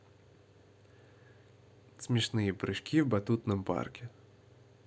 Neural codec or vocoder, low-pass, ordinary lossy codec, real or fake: none; none; none; real